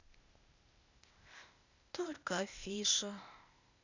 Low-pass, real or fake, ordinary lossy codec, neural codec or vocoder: 7.2 kHz; fake; none; codec, 16 kHz, 0.8 kbps, ZipCodec